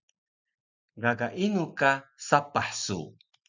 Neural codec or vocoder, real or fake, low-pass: none; real; 7.2 kHz